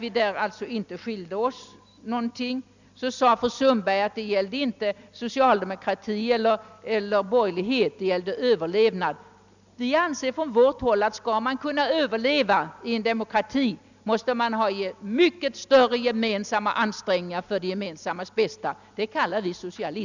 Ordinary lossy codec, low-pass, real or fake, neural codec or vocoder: none; 7.2 kHz; real; none